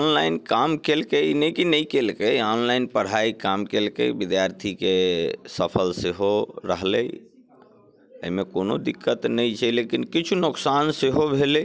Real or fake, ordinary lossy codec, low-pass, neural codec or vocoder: real; none; none; none